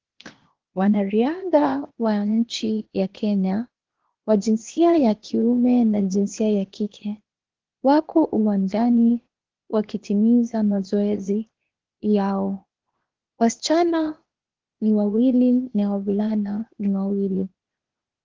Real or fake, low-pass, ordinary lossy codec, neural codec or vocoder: fake; 7.2 kHz; Opus, 16 kbps; codec, 16 kHz, 0.8 kbps, ZipCodec